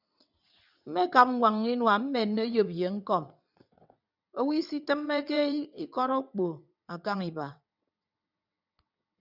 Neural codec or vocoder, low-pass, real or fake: vocoder, 22.05 kHz, 80 mel bands, WaveNeXt; 5.4 kHz; fake